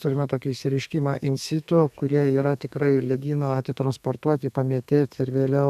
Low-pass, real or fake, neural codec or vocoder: 14.4 kHz; fake; codec, 32 kHz, 1.9 kbps, SNAC